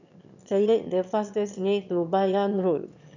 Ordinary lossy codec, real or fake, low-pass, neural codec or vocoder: none; fake; 7.2 kHz; autoencoder, 22.05 kHz, a latent of 192 numbers a frame, VITS, trained on one speaker